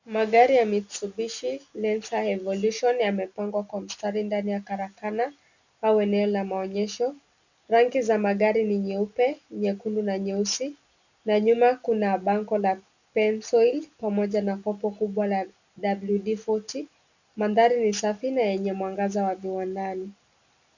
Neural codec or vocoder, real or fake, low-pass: none; real; 7.2 kHz